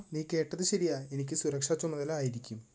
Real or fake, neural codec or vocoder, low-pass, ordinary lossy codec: real; none; none; none